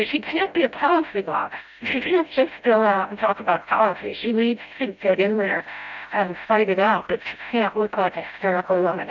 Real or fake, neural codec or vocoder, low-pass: fake; codec, 16 kHz, 0.5 kbps, FreqCodec, smaller model; 7.2 kHz